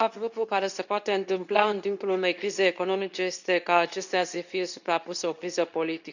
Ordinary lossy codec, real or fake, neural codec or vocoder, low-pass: none; fake; codec, 24 kHz, 0.9 kbps, WavTokenizer, medium speech release version 2; 7.2 kHz